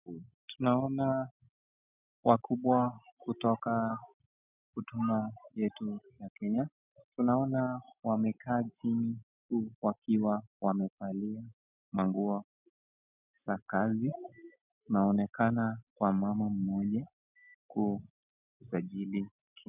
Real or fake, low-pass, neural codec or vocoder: real; 3.6 kHz; none